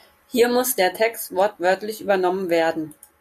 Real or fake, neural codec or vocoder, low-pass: real; none; 14.4 kHz